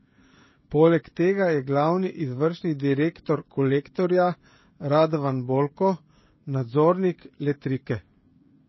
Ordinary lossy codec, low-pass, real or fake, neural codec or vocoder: MP3, 24 kbps; 7.2 kHz; fake; codec, 16 kHz, 16 kbps, FreqCodec, smaller model